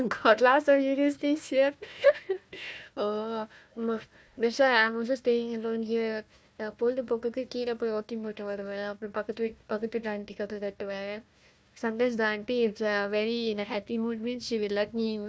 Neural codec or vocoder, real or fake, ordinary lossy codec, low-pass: codec, 16 kHz, 1 kbps, FunCodec, trained on Chinese and English, 50 frames a second; fake; none; none